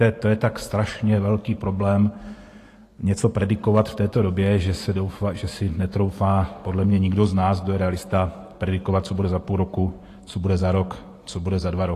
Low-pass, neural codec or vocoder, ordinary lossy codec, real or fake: 14.4 kHz; vocoder, 44.1 kHz, 128 mel bands every 512 samples, BigVGAN v2; AAC, 48 kbps; fake